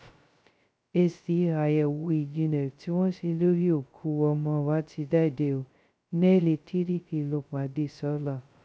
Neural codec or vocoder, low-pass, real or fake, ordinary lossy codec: codec, 16 kHz, 0.2 kbps, FocalCodec; none; fake; none